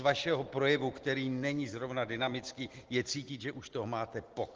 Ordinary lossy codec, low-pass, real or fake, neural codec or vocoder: Opus, 16 kbps; 7.2 kHz; real; none